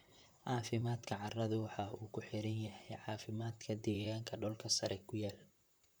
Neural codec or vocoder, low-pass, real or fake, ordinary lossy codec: vocoder, 44.1 kHz, 128 mel bands, Pupu-Vocoder; none; fake; none